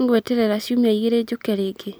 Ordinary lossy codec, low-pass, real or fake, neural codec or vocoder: none; none; real; none